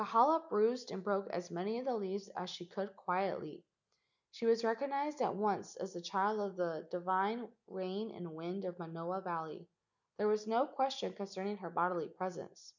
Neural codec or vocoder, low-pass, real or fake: none; 7.2 kHz; real